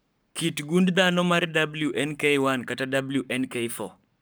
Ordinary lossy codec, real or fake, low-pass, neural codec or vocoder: none; fake; none; codec, 44.1 kHz, 7.8 kbps, Pupu-Codec